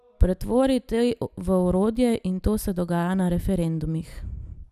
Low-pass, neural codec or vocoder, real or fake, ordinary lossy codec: 14.4 kHz; none; real; none